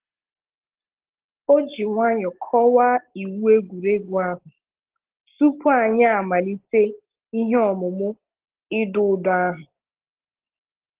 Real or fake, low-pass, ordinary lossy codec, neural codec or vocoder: real; 3.6 kHz; Opus, 16 kbps; none